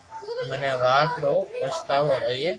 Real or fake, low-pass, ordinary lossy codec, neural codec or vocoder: fake; 9.9 kHz; AAC, 48 kbps; codec, 44.1 kHz, 3.4 kbps, Pupu-Codec